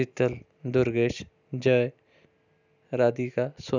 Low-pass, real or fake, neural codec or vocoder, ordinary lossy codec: 7.2 kHz; real; none; none